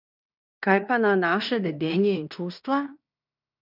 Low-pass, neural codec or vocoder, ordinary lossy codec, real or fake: 5.4 kHz; codec, 16 kHz in and 24 kHz out, 0.9 kbps, LongCat-Audio-Codec, fine tuned four codebook decoder; none; fake